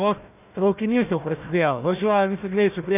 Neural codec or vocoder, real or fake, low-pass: codec, 16 kHz, 1 kbps, FunCodec, trained on Chinese and English, 50 frames a second; fake; 3.6 kHz